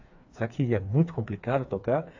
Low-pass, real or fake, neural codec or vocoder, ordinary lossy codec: 7.2 kHz; fake; codec, 16 kHz, 4 kbps, FreqCodec, smaller model; AAC, 48 kbps